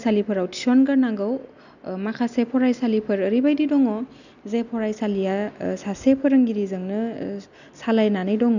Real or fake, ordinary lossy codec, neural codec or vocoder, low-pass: real; none; none; 7.2 kHz